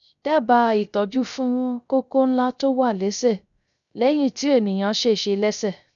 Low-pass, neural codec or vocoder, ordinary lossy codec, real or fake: 7.2 kHz; codec, 16 kHz, 0.3 kbps, FocalCodec; none; fake